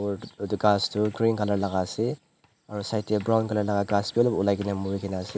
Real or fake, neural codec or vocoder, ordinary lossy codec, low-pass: real; none; none; none